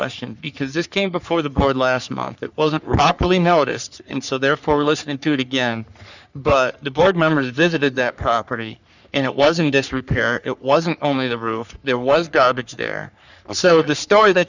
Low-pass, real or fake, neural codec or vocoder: 7.2 kHz; fake; codec, 44.1 kHz, 3.4 kbps, Pupu-Codec